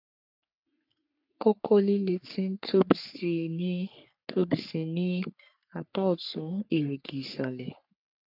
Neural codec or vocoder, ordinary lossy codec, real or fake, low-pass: codec, 44.1 kHz, 3.4 kbps, Pupu-Codec; none; fake; 5.4 kHz